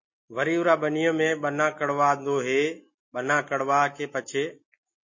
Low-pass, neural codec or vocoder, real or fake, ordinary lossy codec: 7.2 kHz; none; real; MP3, 32 kbps